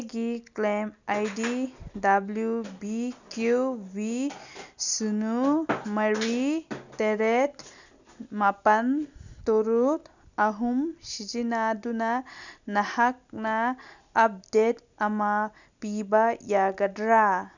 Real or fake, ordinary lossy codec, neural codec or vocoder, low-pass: real; none; none; 7.2 kHz